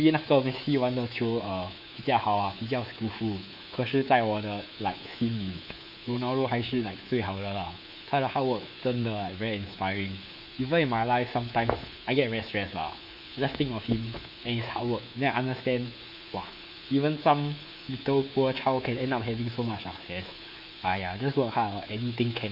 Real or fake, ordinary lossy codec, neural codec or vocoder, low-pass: fake; none; codec, 24 kHz, 3.1 kbps, DualCodec; 5.4 kHz